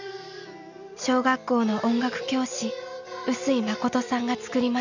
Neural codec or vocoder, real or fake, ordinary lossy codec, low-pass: none; real; none; 7.2 kHz